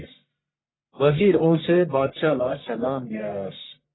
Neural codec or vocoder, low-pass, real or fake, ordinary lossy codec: codec, 44.1 kHz, 1.7 kbps, Pupu-Codec; 7.2 kHz; fake; AAC, 16 kbps